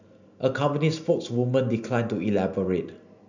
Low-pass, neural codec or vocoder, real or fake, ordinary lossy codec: 7.2 kHz; none; real; none